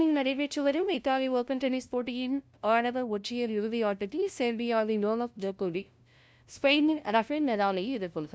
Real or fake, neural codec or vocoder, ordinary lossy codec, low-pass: fake; codec, 16 kHz, 0.5 kbps, FunCodec, trained on LibriTTS, 25 frames a second; none; none